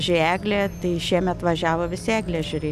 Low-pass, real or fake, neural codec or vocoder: 14.4 kHz; real; none